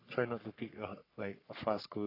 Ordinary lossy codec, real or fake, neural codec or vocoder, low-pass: AAC, 32 kbps; fake; codec, 44.1 kHz, 3.4 kbps, Pupu-Codec; 5.4 kHz